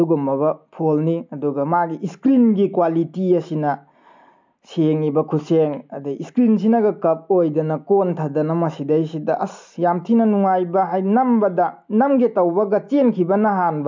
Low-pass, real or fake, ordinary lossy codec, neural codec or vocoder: 7.2 kHz; real; MP3, 64 kbps; none